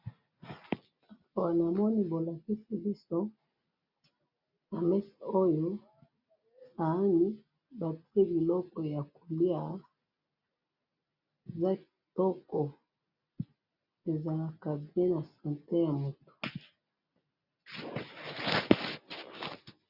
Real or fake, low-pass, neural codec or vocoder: real; 5.4 kHz; none